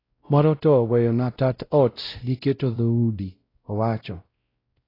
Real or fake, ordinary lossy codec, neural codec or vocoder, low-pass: fake; AAC, 24 kbps; codec, 16 kHz, 0.5 kbps, X-Codec, WavLM features, trained on Multilingual LibriSpeech; 5.4 kHz